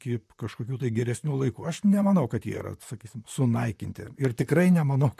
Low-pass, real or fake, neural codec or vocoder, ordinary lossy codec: 14.4 kHz; fake; vocoder, 44.1 kHz, 128 mel bands, Pupu-Vocoder; MP3, 96 kbps